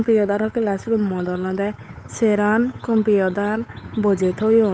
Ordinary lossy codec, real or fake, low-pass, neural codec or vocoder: none; fake; none; codec, 16 kHz, 8 kbps, FunCodec, trained on Chinese and English, 25 frames a second